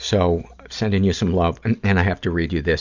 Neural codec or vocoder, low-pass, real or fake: none; 7.2 kHz; real